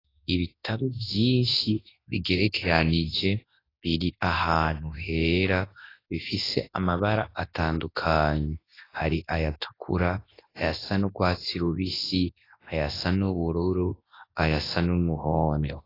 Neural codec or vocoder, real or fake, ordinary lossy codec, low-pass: codec, 24 kHz, 0.9 kbps, WavTokenizer, large speech release; fake; AAC, 24 kbps; 5.4 kHz